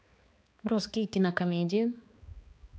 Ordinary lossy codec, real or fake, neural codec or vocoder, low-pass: none; fake; codec, 16 kHz, 2 kbps, X-Codec, HuBERT features, trained on balanced general audio; none